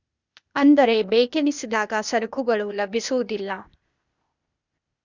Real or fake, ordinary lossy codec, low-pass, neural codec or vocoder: fake; Opus, 64 kbps; 7.2 kHz; codec, 16 kHz, 0.8 kbps, ZipCodec